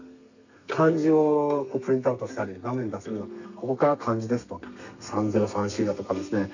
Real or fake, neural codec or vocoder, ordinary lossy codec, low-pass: fake; codec, 32 kHz, 1.9 kbps, SNAC; none; 7.2 kHz